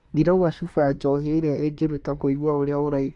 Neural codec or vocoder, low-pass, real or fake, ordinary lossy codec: codec, 24 kHz, 1 kbps, SNAC; 10.8 kHz; fake; AAC, 64 kbps